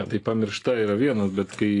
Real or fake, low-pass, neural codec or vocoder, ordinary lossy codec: real; 10.8 kHz; none; AAC, 48 kbps